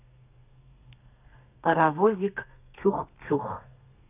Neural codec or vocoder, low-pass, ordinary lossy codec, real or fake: codec, 32 kHz, 1.9 kbps, SNAC; 3.6 kHz; none; fake